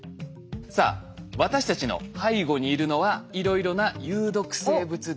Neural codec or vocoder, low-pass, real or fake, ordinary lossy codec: none; none; real; none